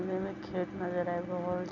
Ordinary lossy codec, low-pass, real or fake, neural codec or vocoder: none; 7.2 kHz; real; none